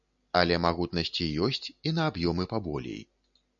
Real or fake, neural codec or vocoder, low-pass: real; none; 7.2 kHz